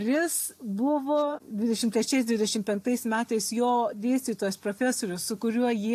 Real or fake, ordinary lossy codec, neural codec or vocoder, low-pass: real; AAC, 64 kbps; none; 14.4 kHz